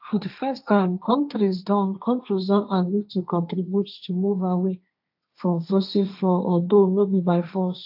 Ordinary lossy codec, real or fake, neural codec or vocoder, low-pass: AAC, 48 kbps; fake; codec, 16 kHz, 1.1 kbps, Voila-Tokenizer; 5.4 kHz